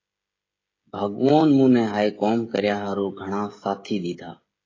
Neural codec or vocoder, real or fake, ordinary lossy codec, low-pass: codec, 16 kHz, 16 kbps, FreqCodec, smaller model; fake; AAC, 32 kbps; 7.2 kHz